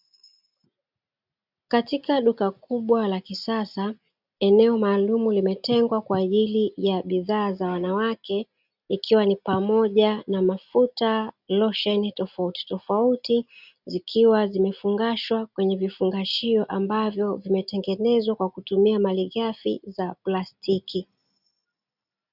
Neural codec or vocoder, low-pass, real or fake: none; 5.4 kHz; real